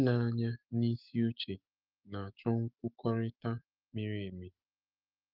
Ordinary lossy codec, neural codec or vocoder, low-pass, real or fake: Opus, 32 kbps; autoencoder, 48 kHz, 128 numbers a frame, DAC-VAE, trained on Japanese speech; 5.4 kHz; fake